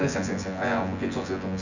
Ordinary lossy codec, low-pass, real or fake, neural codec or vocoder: none; 7.2 kHz; fake; vocoder, 24 kHz, 100 mel bands, Vocos